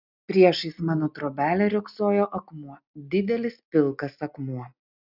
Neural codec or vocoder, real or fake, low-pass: none; real; 5.4 kHz